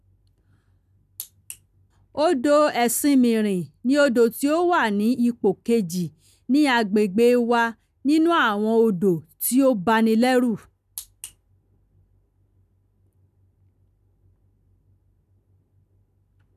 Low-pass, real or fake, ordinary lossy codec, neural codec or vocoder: 14.4 kHz; real; none; none